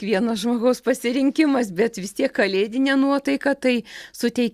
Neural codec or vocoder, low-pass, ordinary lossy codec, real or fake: none; 14.4 kHz; Opus, 64 kbps; real